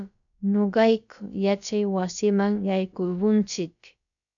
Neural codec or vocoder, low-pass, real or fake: codec, 16 kHz, about 1 kbps, DyCAST, with the encoder's durations; 7.2 kHz; fake